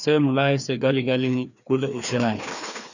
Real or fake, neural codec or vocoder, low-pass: fake; codec, 16 kHz, 2 kbps, FreqCodec, larger model; 7.2 kHz